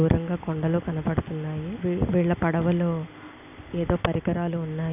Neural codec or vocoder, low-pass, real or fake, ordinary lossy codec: none; 3.6 kHz; real; none